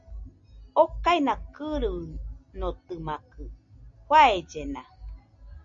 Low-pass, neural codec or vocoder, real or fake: 7.2 kHz; none; real